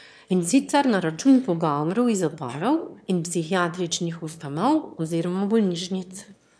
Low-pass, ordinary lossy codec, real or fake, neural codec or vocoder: none; none; fake; autoencoder, 22.05 kHz, a latent of 192 numbers a frame, VITS, trained on one speaker